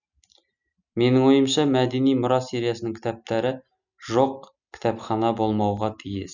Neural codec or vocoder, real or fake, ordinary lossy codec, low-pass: none; real; none; 7.2 kHz